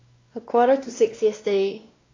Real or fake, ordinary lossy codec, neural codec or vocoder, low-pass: fake; AAC, 32 kbps; codec, 16 kHz, 1 kbps, X-Codec, WavLM features, trained on Multilingual LibriSpeech; 7.2 kHz